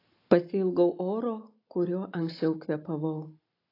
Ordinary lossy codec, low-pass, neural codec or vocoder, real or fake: AAC, 32 kbps; 5.4 kHz; none; real